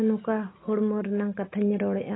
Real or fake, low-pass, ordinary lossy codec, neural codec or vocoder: real; 7.2 kHz; AAC, 16 kbps; none